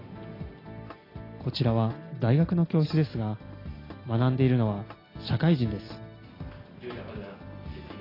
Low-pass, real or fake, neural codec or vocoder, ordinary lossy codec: 5.4 kHz; real; none; AAC, 24 kbps